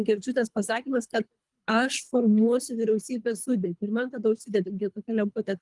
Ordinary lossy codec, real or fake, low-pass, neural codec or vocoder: Opus, 32 kbps; fake; 10.8 kHz; codec, 24 kHz, 3 kbps, HILCodec